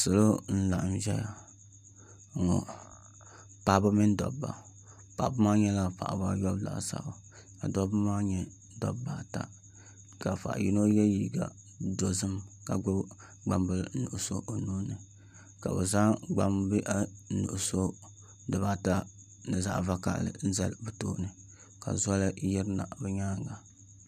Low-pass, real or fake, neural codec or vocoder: 14.4 kHz; real; none